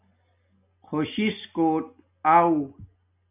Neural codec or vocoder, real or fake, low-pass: none; real; 3.6 kHz